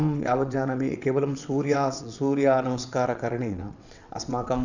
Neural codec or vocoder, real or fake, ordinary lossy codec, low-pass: vocoder, 22.05 kHz, 80 mel bands, WaveNeXt; fake; none; 7.2 kHz